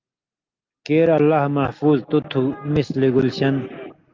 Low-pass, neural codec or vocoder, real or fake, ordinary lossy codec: 7.2 kHz; none; real; Opus, 32 kbps